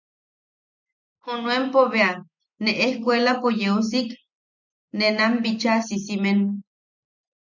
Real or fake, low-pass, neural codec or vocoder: real; 7.2 kHz; none